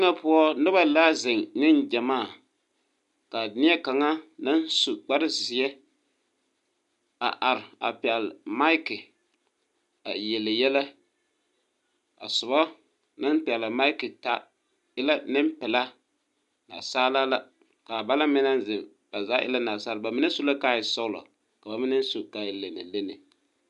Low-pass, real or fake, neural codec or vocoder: 10.8 kHz; real; none